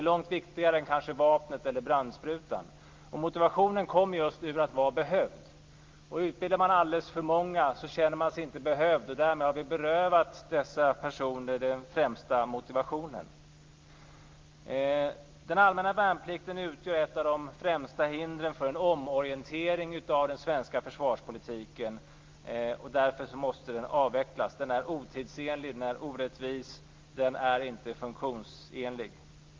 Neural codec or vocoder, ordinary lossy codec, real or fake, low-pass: none; Opus, 32 kbps; real; 7.2 kHz